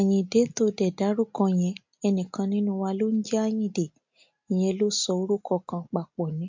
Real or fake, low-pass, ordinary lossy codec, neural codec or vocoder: real; 7.2 kHz; MP3, 48 kbps; none